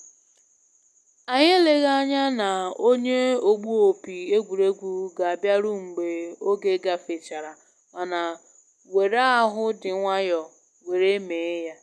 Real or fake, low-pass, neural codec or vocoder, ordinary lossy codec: real; none; none; none